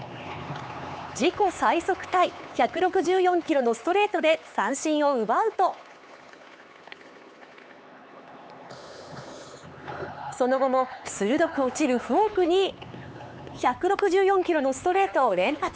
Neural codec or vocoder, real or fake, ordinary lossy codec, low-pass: codec, 16 kHz, 4 kbps, X-Codec, HuBERT features, trained on LibriSpeech; fake; none; none